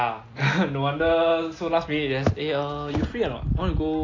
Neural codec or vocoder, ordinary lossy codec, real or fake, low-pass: none; AAC, 32 kbps; real; 7.2 kHz